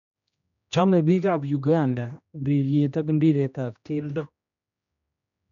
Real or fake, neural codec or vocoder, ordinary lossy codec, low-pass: fake; codec, 16 kHz, 1 kbps, X-Codec, HuBERT features, trained on general audio; none; 7.2 kHz